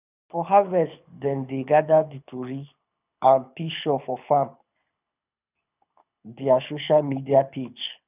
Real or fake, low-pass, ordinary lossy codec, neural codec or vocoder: fake; 3.6 kHz; none; codec, 24 kHz, 6 kbps, HILCodec